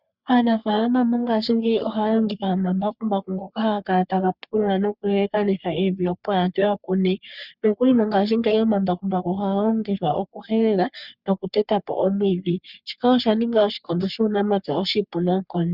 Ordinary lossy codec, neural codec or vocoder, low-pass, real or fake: Opus, 64 kbps; codec, 44.1 kHz, 3.4 kbps, Pupu-Codec; 5.4 kHz; fake